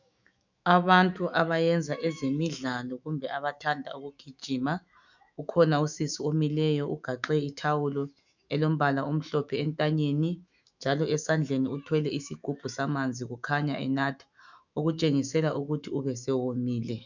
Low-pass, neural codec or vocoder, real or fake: 7.2 kHz; autoencoder, 48 kHz, 128 numbers a frame, DAC-VAE, trained on Japanese speech; fake